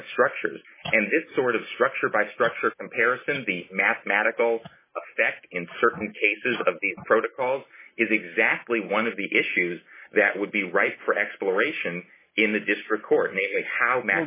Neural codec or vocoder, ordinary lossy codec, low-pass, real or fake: none; MP3, 16 kbps; 3.6 kHz; real